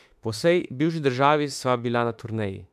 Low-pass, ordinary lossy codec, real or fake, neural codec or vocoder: 14.4 kHz; MP3, 96 kbps; fake; autoencoder, 48 kHz, 32 numbers a frame, DAC-VAE, trained on Japanese speech